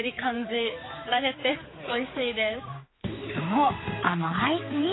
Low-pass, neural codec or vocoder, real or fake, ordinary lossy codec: 7.2 kHz; codec, 16 kHz, 4 kbps, X-Codec, HuBERT features, trained on general audio; fake; AAC, 16 kbps